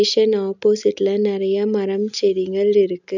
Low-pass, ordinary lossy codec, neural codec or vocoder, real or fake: 7.2 kHz; none; none; real